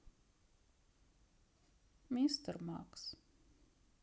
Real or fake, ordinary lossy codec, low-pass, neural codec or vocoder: real; none; none; none